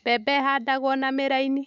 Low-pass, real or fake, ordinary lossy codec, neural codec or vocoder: 7.2 kHz; fake; none; autoencoder, 48 kHz, 128 numbers a frame, DAC-VAE, trained on Japanese speech